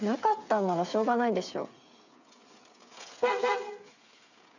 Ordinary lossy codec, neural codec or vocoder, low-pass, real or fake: none; codec, 16 kHz, 8 kbps, FreqCodec, smaller model; 7.2 kHz; fake